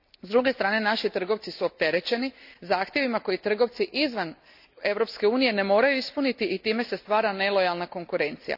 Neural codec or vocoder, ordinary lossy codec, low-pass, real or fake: none; none; 5.4 kHz; real